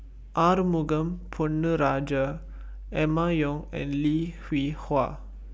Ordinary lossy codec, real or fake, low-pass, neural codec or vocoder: none; real; none; none